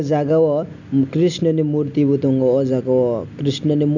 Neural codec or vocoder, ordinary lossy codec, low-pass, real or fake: none; none; 7.2 kHz; real